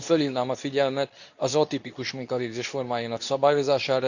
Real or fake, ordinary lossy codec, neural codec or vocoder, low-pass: fake; none; codec, 24 kHz, 0.9 kbps, WavTokenizer, medium speech release version 2; 7.2 kHz